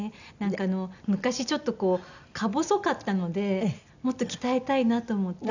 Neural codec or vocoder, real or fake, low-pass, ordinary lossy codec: none; real; 7.2 kHz; none